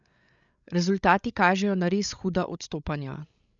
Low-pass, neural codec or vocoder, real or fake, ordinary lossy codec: 7.2 kHz; codec, 16 kHz, 8 kbps, FreqCodec, larger model; fake; none